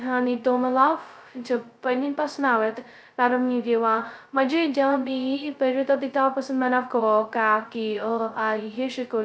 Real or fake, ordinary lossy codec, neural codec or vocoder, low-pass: fake; none; codec, 16 kHz, 0.2 kbps, FocalCodec; none